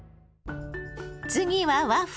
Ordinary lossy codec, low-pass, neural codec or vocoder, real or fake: none; none; none; real